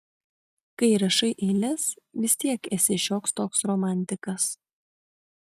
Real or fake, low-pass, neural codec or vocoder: real; 14.4 kHz; none